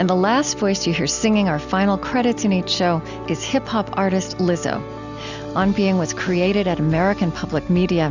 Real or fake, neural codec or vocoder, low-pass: real; none; 7.2 kHz